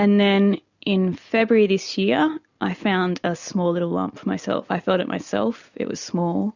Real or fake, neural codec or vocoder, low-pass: real; none; 7.2 kHz